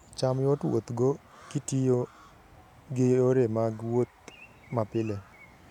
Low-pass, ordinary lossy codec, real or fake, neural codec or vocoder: 19.8 kHz; none; real; none